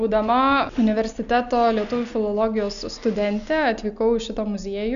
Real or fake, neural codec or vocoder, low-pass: real; none; 7.2 kHz